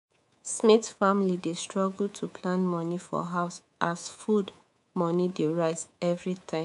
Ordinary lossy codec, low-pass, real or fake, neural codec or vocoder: none; 10.8 kHz; fake; codec, 24 kHz, 3.1 kbps, DualCodec